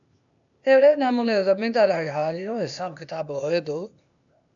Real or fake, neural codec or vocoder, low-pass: fake; codec, 16 kHz, 0.8 kbps, ZipCodec; 7.2 kHz